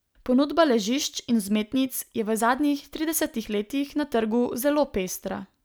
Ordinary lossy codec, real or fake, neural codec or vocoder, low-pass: none; real; none; none